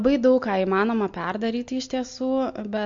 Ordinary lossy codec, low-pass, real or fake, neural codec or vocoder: MP3, 48 kbps; 7.2 kHz; real; none